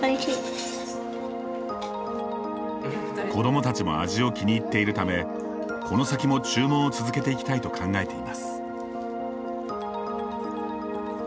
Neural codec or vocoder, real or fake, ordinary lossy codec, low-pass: none; real; none; none